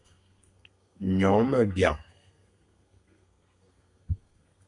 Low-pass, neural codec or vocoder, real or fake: 10.8 kHz; codec, 32 kHz, 1.9 kbps, SNAC; fake